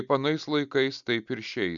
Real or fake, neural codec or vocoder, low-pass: real; none; 7.2 kHz